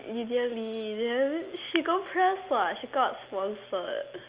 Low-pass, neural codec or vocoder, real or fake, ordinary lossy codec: 3.6 kHz; none; real; Opus, 32 kbps